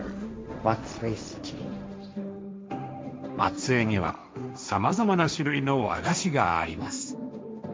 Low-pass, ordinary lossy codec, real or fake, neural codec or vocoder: none; none; fake; codec, 16 kHz, 1.1 kbps, Voila-Tokenizer